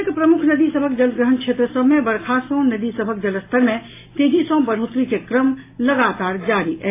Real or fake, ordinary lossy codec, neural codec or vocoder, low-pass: real; AAC, 24 kbps; none; 3.6 kHz